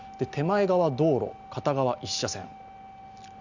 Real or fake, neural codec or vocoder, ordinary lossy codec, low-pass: real; none; none; 7.2 kHz